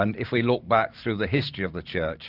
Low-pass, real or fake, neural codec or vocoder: 5.4 kHz; fake; vocoder, 44.1 kHz, 128 mel bands every 512 samples, BigVGAN v2